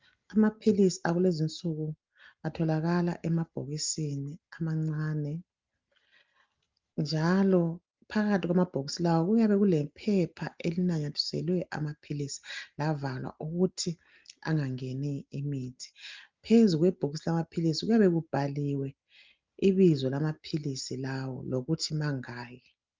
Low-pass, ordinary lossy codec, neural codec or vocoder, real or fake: 7.2 kHz; Opus, 24 kbps; none; real